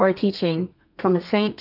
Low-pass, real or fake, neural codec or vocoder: 5.4 kHz; fake; codec, 32 kHz, 1.9 kbps, SNAC